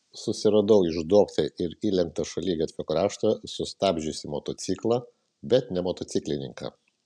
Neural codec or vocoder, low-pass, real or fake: none; 9.9 kHz; real